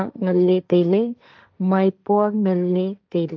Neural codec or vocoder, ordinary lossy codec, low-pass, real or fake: codec, 16 kHz, 1.1 kbps, Voila-Tokenizer; none; none; fake